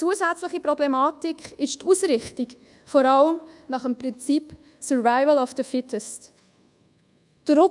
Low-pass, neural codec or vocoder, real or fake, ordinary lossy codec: 10.8 kHz; codec, 24 kHz, 1.2 kbps, DualCodec; fake; none